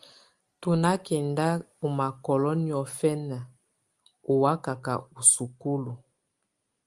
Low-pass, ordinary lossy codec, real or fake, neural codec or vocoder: 10.8 kHz; Opus, 32 kbps; real; none